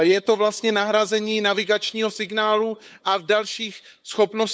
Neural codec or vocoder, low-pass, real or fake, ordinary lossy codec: codec, 16 kHz, 8 kbps, FunCodec, trained on LibriTTS, 25 frames a second; none; fake; none